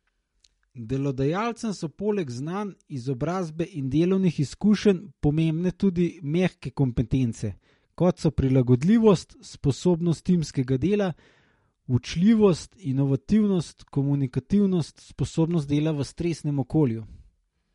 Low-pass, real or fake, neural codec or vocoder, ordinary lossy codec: 19.8 kHz; real; none; MP3, 48 kbps